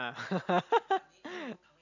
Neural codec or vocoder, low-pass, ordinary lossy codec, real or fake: none; 7.2 kHz; none; real